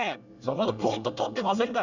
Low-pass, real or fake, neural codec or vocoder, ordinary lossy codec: 7.2 kHz; fake; codec, 24 kHz, 1 kbps, SNAC; none